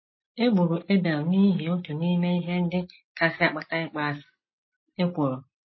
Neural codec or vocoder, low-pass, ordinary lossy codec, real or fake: none; 7.2 kHz; MP3, 24 kbps; real